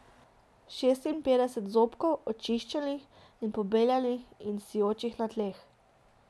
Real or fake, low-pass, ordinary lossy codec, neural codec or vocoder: real; none; none; none